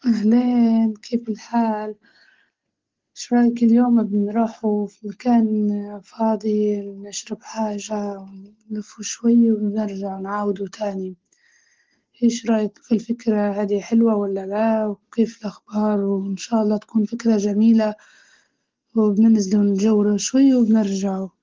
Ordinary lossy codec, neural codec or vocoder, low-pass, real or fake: Opus, 16 kbps; none; 7.2 kHz; real